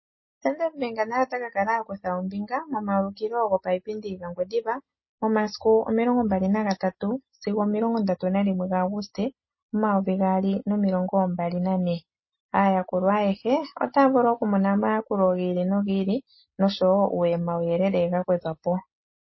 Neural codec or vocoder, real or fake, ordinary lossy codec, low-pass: none; real; MP3, 24 kbps; 7.2 kHz